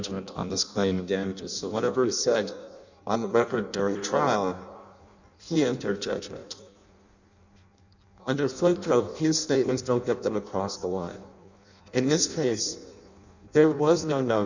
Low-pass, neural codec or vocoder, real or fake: 7.2 kHz; codec, 16 kHz in and 24 kHz out, 0.6 kbps, FireRedTTS-2 codec; fake